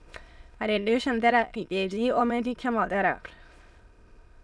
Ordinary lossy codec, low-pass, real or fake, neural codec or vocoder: none; none; fake; autoencoder, 22.05 kHz, a latent of 192 numbers a frame, VITS, trained on many speakers